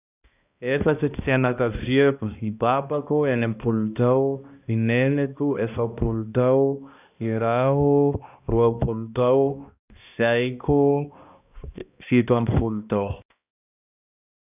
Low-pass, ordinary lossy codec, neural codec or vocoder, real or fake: 3.6 kHz; none; codec, 16 kHz, 1 kbps, X-Codec, HuBERT features, trained on balanced general audio; fake